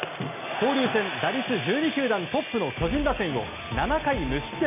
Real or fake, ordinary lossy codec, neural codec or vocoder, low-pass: real; none; none; 3.6 kHz